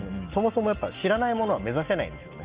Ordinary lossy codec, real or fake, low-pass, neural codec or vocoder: Opus, 32 kbps; real; 3.6 kHz; none